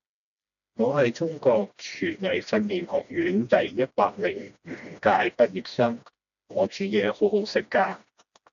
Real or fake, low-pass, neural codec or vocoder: fake; 7.2 kHz; codec, 16 kHz, 1 kbps, FreqCodec, smaller model